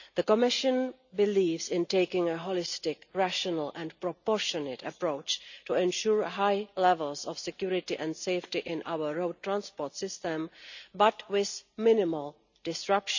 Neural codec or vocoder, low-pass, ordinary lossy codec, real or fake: none; 7.2 kHz; none; real